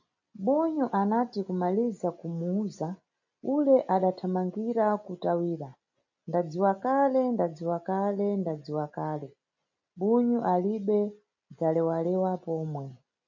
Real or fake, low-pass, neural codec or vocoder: real; 7.2 kHz; none